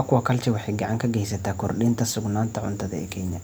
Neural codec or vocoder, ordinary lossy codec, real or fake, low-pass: none; none; real; none